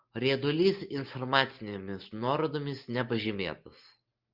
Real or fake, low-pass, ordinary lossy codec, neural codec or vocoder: real; 5.4 kHz; Opus, 32 kbps; none